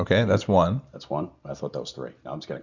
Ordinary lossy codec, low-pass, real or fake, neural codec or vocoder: Opus, 64 kbps; 7.2 kHz; real; none